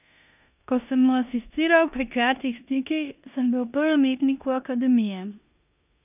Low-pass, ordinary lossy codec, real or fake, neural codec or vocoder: 3.6 kHz; none; fake; codec, 16 kHz in and 24 kHz out, 0.9 kbps, LongCat-Audio-Codec, four codebook decoder